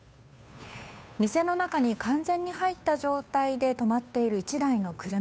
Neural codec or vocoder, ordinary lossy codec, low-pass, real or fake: codec, 16 kHz, 2 kbps, FunCodec, trained on Chinese and English, 25 frames a second; none; none; fake